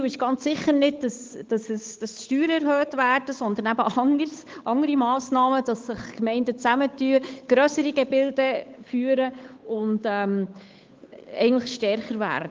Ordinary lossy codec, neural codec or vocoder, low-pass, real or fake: Opus, 32 kbps; codec, 16 kHz, 8 kbps, FunCodec, trained on Chinese and English, 25 frames a second; 7.2 kHz; fake